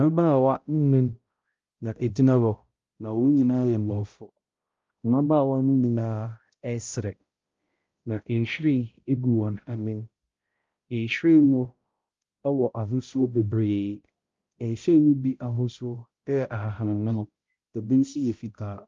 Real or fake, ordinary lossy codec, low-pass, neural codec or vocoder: fake; Opus, 32 kbps; 7.2 kHz; codec, 16 kHz, 0.5 kbps, X-Codec, HuBERT features, trained on balanced general audio